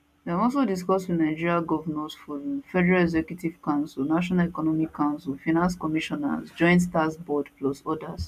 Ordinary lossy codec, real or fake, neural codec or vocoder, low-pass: none; real; none; 14.4 kHz